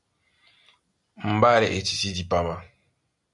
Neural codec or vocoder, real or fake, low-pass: none; real; 10.8 kHz